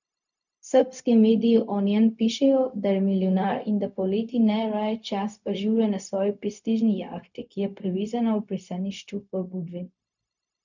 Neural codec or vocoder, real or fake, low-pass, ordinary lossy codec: codec, 16 kHz, 0.4 kbps, LongCat-Audio-Codec; fake; 7.2 kHz; none